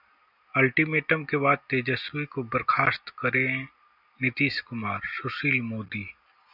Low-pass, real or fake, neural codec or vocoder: 5.4 kHz; real; none